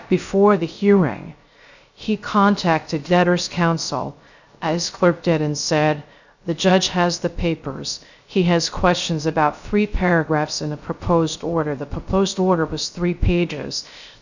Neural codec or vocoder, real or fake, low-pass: codec, 16 kHz, 0.3 kbps, FocalCodec; fake; 7.2 kHz